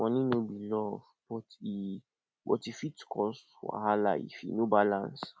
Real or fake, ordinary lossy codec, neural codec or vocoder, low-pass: real; none; none; none